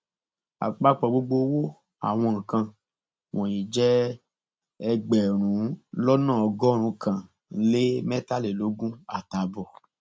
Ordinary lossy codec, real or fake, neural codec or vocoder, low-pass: none; real; none; none